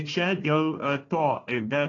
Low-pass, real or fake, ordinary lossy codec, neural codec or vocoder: 7.2 kHz; fake; AAC, 32 kbps; codec, 16 kHz, 1 kbps, FunCodec, trained on Chinese and English, 50 frames a second